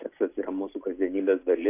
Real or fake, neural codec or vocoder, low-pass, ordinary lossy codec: real; none; 3.6 kHz; AAC, 24 kbps